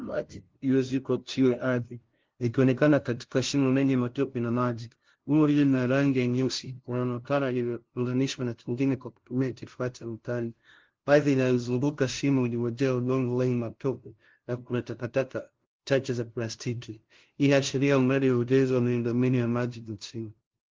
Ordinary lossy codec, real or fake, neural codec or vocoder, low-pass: Opus, 16 kbps; fake; codec, 16 kHz, 0.5 kbps, FunCodec, trained on LibriTTS, 25 frames a second; 7.2 kHz